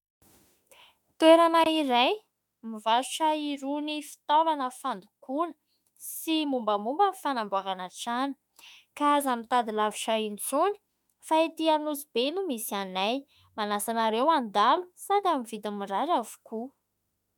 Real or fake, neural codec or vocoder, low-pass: fake; autoencoder, 48 kHz, 32 numbers a frame, DAC-VAE, trained on Japanese speech; 19.8 kHz